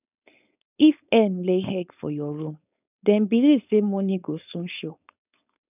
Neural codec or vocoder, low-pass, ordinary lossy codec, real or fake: codec, 16 kHz, 4.8 kbps, FACodec; 3.6 kHz; none; fake